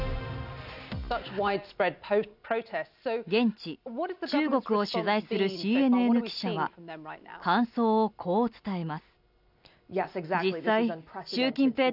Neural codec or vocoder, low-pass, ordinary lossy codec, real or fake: none; 5.4 kHz; none; real